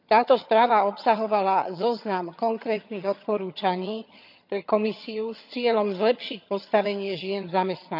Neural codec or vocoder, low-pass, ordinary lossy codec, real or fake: vocoder, 22.05 kHz, 80 mel bands, HiFi-GAN; 5.4 kHz; none; fake